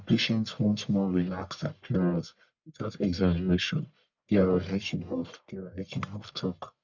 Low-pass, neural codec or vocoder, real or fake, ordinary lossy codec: 7.2 kHz; codec, 44.1 kHz, 1.7 kbps, Pupu-Codec; fake; none